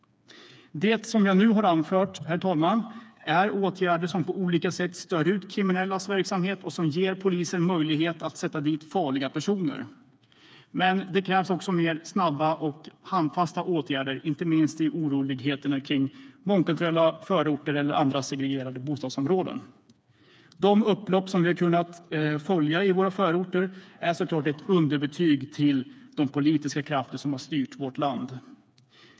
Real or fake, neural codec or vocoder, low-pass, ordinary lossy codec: fake; codec, 16 kHz, 4 kbps, FreqCodec, smaller model; none; none